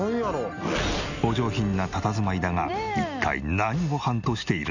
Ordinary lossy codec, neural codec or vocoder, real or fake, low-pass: none; none; real; 7.2 kHz